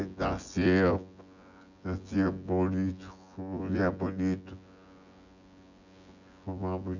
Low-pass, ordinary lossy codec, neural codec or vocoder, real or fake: 7.2 kHz; none; vocoder, 24 kHz, 100 mel bands, Vocos; fake